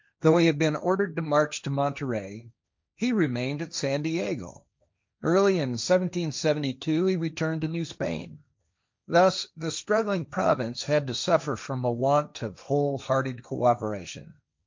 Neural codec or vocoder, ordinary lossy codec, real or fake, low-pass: codec, 16 kHz, 1.1 kbps, Voila-Tokenizer; MP3, 64 kbps; fake; 7.2 kHz